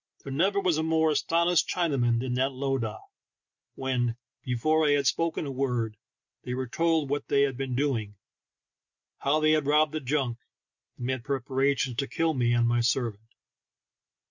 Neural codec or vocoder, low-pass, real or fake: none; 7.2 kHz; real